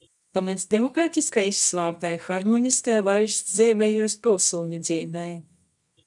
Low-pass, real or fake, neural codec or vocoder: 10.8 kHz; fake; codec, 24 kHz, 0.9 kbps, WavTokenizer, medium music audio release